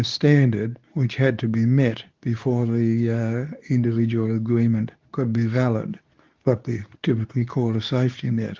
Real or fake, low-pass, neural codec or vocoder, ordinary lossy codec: fake; 7.2 kHz; codec, 24 kHz, 0.9 kbps, WavTokenizer, medium speech release version 2; Opus, 32 kbps